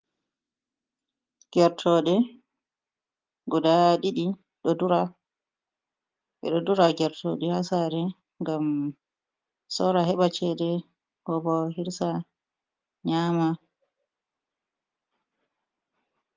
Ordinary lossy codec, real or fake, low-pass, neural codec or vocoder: Opus, 32 kbps; real; 7.2 kHz; none